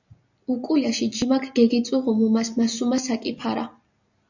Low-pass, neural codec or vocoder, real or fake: 7.2 kHz; none; real